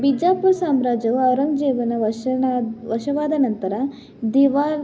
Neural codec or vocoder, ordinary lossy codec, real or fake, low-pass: none; none; real; none